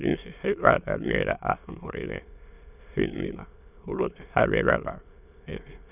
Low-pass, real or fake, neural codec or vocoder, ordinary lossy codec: 3.6 kHz; fake; autoencoder, 22.05 kHz, a latent of 192 numbers a frame, VITS, trained on many speakers; none